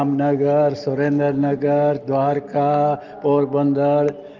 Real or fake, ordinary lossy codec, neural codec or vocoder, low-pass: real; Opus, 32 kbps; none; 7.2 kHz